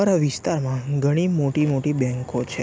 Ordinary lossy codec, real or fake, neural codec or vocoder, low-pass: none; real; none; none